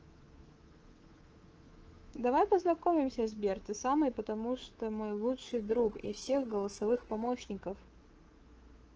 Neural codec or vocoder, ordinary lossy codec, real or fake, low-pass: codec, 24 kHz, 3.1 kbps, DualCodec; Opus, 16 kbps; fake; 7.2 kHz